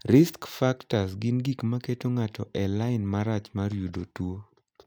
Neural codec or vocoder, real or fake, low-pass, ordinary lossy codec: none; real; none; none